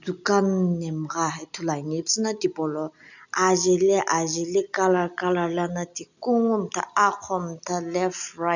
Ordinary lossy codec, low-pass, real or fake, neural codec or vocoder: none; 7.2 kHz; real; none